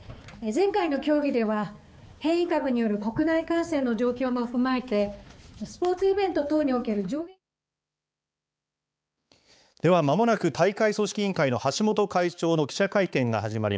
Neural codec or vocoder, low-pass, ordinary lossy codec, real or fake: codec, 16 kHz, 4 kbps, X-Codec, HuBERT features, trained on balanced general audio; none; none; fake